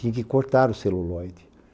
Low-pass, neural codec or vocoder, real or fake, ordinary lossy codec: none; none; real; none